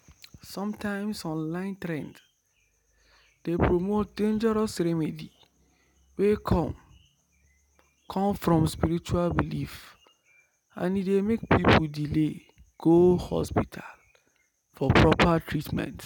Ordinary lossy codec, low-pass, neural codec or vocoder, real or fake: none; 19.8 kHz; none; real